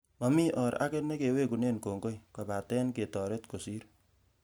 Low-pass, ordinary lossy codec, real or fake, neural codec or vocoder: none; none; real; none